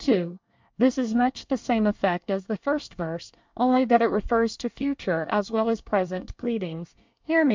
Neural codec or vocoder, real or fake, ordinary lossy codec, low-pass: codec, 24 kHz, 1 kbps, SNAC; fake; MP3, 64 kbps; 7.2 kHz